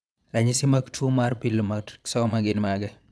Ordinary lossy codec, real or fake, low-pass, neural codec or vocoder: none; fake; none; vocoder, 22.05 kHz, 80 mel bands, Vocos